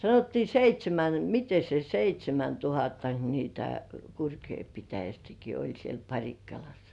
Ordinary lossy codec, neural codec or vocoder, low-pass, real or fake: none; none; 10.8 kHz; real